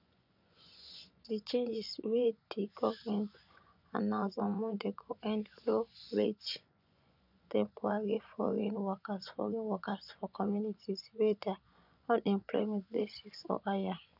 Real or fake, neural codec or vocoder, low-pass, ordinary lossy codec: fake; vocoder, 24 kHz, 100 mel bands, Vocos; 5.4 kHz; none